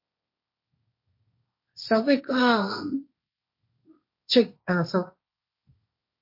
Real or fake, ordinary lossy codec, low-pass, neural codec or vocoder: fake; MP3, 32 kbps; 5.4 kHz; codec, 16 kHz, 1.1 kbps, Voila-Tokenizer